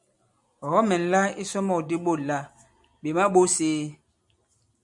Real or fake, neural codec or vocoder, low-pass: real; none; 10.8 kHz